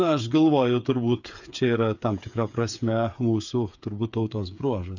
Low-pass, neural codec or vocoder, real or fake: 7.2 kHz; codec, 16 kHz, 8 kbps, FreqCodec, smaller model; fake